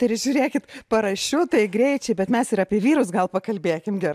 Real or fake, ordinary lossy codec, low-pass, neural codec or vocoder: real; AAC, 96 kbps; 14.4 kHz; none